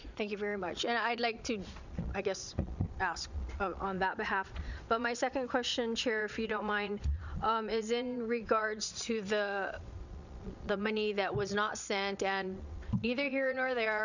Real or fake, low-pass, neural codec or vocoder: fake; 7.2 kHz; vocoder, 22.05 kHz, 80 mel bands, Vocos